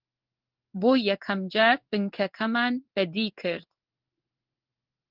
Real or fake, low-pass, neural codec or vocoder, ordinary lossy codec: fake; 5.4 kHz; codec, 16 kHz in and 24 kHz out, 1 kbps, XY-Tokenizer; Opus, 32 kbps